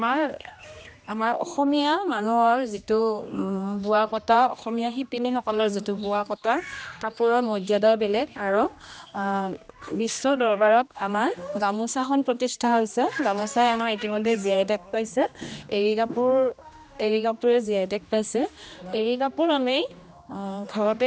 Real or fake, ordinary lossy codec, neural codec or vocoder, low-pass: fake; none; codec, 16 kHz, 1 kbps, X-Codec, HuBERT features, trained on general audio; none